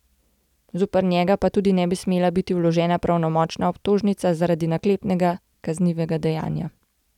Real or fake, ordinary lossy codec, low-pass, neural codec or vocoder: real; none; 19.8 kHz; none